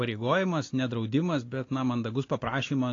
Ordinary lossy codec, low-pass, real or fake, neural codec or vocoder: AAC, 32 kbps; 7.2 kHz; real; none